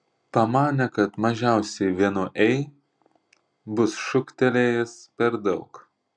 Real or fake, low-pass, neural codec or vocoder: real; 9.9 kHz; none